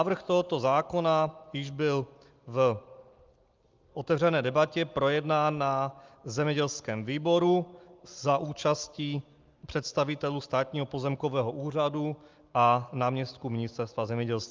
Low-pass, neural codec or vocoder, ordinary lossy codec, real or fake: 7.2 kHz; none; Opus, 32 kbps; real